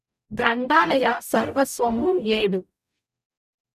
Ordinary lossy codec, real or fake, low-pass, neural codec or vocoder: none; fake; 14.4 kHz; codec, 44.1 kHz, 0.9 kbps, DAC